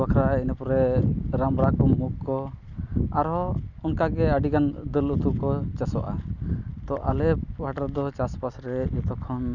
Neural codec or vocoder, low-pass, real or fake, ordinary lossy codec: none; 7.2 kHz; real; none